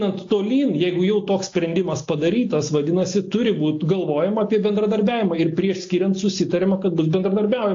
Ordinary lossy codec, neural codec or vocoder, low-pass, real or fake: AAC, 48 kbps; none; 7.2 kHz; real